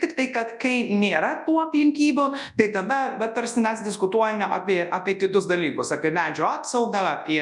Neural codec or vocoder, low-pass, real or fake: codec, 24 kHz, 0.9 kbps, WavTokenizer, large speech release; 10.8 kHz; fake